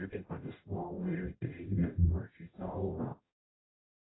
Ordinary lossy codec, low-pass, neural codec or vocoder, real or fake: AAC, 16 kbps; 7.2 kHz; codec, 44.1 kHz, 0.9 kbps, DAC; fake